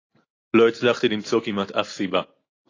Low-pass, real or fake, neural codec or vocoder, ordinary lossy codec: 7.2 kHz; real; none; AAC, 32 kbps